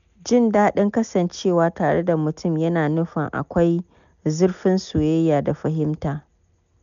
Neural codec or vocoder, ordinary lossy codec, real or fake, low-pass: none; none; real; 7.2 kHz